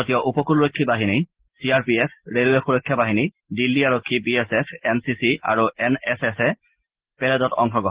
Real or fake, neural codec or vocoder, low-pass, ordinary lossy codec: real; none; 3.6 kHz; Opus, 16 kbps